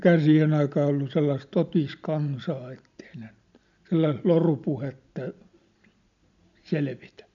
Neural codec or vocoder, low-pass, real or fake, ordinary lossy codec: none; 7.2 kHz; real; MP3, 96 kbps